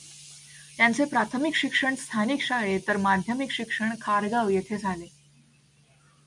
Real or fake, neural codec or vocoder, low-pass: real; none; 10.8 kHz